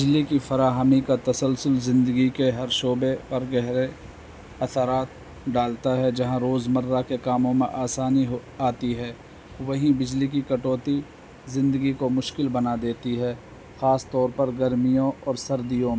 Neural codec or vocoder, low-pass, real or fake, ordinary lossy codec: none; none; real; none